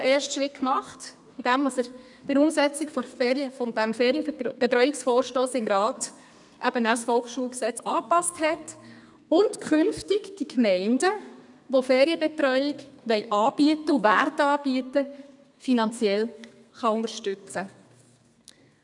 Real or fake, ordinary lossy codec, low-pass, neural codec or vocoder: fake; none; 10.8 kHz; codec, 32 kHz, 1.9 kbps, SNAC